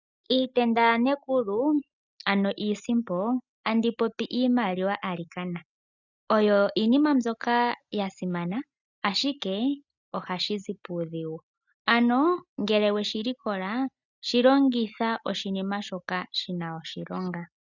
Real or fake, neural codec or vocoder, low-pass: real; none; 7.2 kHz